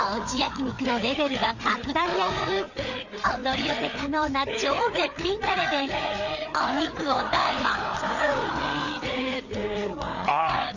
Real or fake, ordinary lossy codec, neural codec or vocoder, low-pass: fake; none; codec, 16 kHz, 4 kbps, FreqCodec, larger model; 7.2 kHz